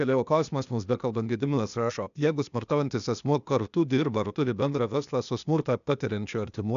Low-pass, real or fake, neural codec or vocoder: 7.2 kHz; fake; codec, 16 kHz, 0.8 kbps, ZipCodec